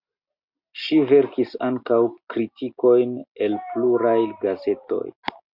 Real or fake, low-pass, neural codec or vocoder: real; 5.4 kHz; none